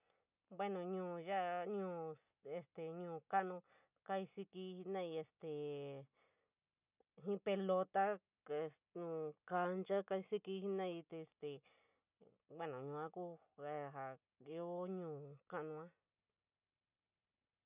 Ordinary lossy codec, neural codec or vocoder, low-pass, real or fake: none; none; 3.6 kHz; real